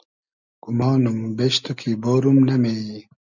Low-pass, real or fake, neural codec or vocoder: 7.2 kHz; real; none